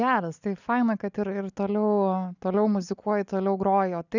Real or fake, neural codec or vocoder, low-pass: real; none; 7.2 kHz